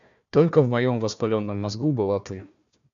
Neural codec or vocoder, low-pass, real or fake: codec, 16 kHz, 1 kbps, FunCodec, trained on Chinese and English, 50 frames a second; 7.2 kHz; fake